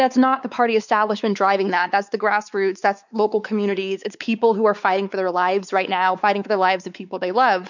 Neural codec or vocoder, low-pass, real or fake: codec, 16 kHz, 4 kbps, X-Codec, WavLM features, trained on Multilingual LibriSpeech; 7.2 kHz; fake